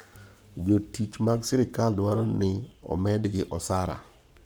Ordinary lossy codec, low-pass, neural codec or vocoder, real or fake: none; none; codec, 44.1 kHz, 7.8 kbps, Pupu-Codec; fake